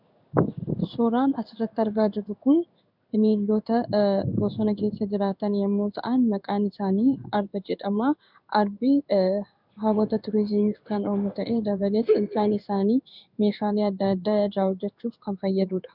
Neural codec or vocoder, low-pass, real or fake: codec, 16 kHz in and 24 kHz out, 1 kbps, XY-Tokenizer; 5.4 kHz; fake